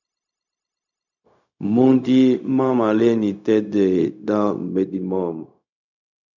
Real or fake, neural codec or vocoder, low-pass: fake; codec, 16 kHz, 0.4 kbps, LongCat-Audio-Codec; 7.2 kHz